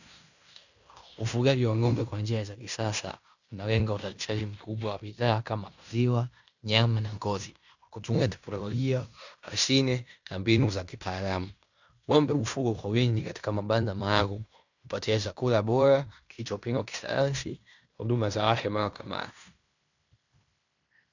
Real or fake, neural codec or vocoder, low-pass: fake; codec, 16 kHz in and 24 kHz out, 0.9 kbps, LongCat-Audio-Codec, fine tuned four codebook decoder; 7.2 kHz